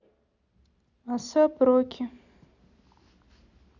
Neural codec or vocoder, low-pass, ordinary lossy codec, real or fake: none; 7.2 kHz; none; real